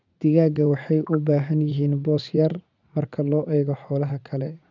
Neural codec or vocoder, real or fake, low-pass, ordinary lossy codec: vocoder, 44.1 kHz, 80 mel bands, Vocos; fake; 7.2 kHz; none